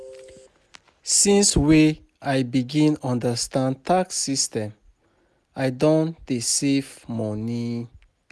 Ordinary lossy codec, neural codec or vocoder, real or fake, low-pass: none; none; real; none